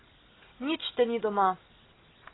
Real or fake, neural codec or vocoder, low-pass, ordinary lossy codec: real; none; 7.2 kHz; AAC, 16 kbps